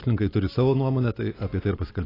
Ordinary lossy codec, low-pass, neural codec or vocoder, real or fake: AAC, 24 kbps; 5.4 kHz; none; real